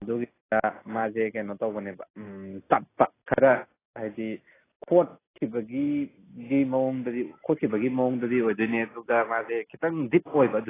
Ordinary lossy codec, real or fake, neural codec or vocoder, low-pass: AAC, 16 kbps; real; none; 3.6 kHz